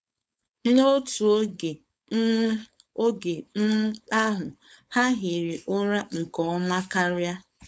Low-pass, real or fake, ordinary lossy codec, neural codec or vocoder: none; fake; none; codec, 16 kHz, 4.8 kbps, FACodec